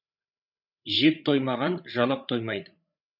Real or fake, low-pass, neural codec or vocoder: fake; 5.4 kHz; codec, 16 kHz, 8 kbps, FreqCodec, larger model